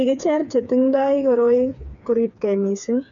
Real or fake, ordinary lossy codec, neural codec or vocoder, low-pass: fake; MP3, 96 kbps; codec, 16 kHz, 4 kbps, FreqCodec, smaller model; 7.2 kHz